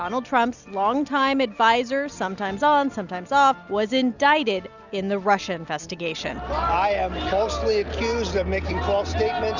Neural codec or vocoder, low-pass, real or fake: none; 7.2 kHz; real